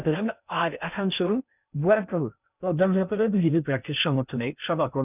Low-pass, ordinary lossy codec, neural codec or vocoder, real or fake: 3.6 kHz; none; codec, 16 kHz in and 24 kHz out, 0.6 kbps, FocalCodec, streaming, 2048 codes; fake